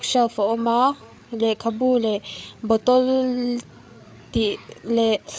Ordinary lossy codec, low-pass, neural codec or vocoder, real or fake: none; none; codec, 16 kHz, 8 kbps, FreqCodec, larger model; fake